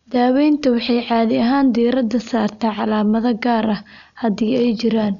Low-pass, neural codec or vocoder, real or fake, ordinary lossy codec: 7.2 kHz; none; real; none